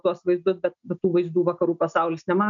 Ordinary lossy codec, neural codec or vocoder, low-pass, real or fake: MP3, 96 kbps; none; 7.2 kHz; real